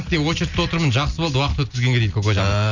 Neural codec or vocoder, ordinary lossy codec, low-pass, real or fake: none; none; 7.2 kHz; real